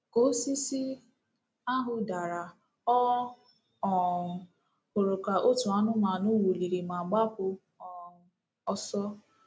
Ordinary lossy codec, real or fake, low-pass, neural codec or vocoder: none; real; none; none